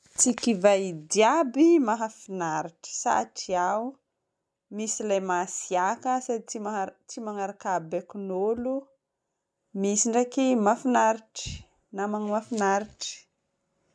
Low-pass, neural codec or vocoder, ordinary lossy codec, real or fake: none; none; none; real